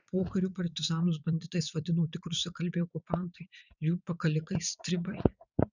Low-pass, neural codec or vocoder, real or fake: 7.2 kHz; vocoder, 44.1 kHz, 80 mel bands, Vocos; fake